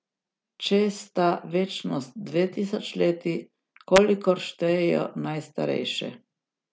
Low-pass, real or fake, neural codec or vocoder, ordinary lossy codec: none; real; none; none